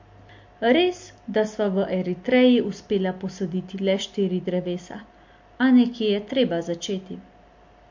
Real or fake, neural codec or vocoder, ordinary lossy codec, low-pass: real; none; MP3, 48 kbps; 7.2 kHz